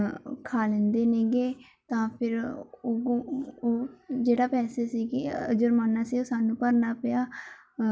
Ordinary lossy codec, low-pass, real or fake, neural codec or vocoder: none; none; real; none